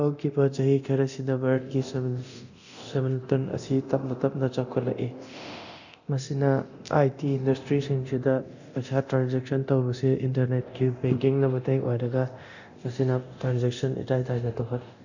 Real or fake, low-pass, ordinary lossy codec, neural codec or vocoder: fake; 7.2 kHz; none; codec, 24 kHz, 0.9 kbps, DualCodec